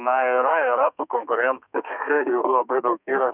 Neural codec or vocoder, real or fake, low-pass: codec, 32 kHz, 1.9 kbps, SNAC; fake; 3.6 kHz